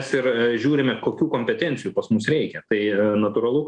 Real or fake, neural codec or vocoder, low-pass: real; none; 9.9 kHz